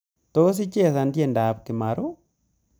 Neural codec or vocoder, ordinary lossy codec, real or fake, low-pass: none; none; real; none